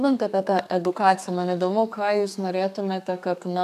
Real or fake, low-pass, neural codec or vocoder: fake; 14.4 kHz; codec, 32 kHz, 1.9 kbps, SNAC